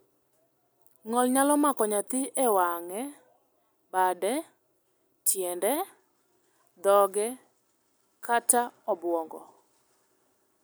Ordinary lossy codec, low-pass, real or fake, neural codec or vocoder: none; none; real; none